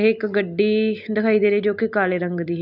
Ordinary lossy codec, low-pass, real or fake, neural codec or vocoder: none; 5.4 kHz; real; none